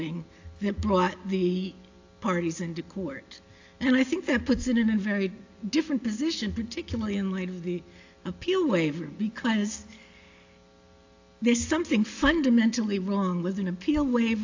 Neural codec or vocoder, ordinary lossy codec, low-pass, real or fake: none; AAC, 48 kbps; 7.2 kHz; real